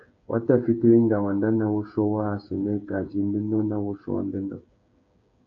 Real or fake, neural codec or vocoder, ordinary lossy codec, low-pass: fake; codec, 16 kHz, 8 kbps, FreqCodec, smaller model; AAC, 64 kbps; 7.2 kHz